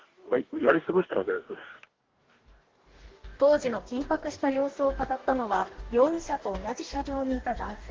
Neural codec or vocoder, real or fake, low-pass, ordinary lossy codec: codec, 44.1 kHz, 2.6 kbps, DAC; fake; 7.2 kHz; Opus, 16 kbps